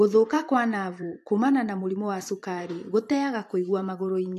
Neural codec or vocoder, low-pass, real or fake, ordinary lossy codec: vocoder, 44.1 kHz, 128 mel bands every 512 samples, BigVGAN v2; 14.4 kHz; fake; AAC, 64 kbps